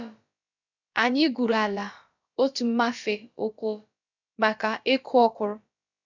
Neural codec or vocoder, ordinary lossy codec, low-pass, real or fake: codec, 16 kHz, about 1 kbps, DyCAST, with the encoder's durations; none; 7.2 kHz; fake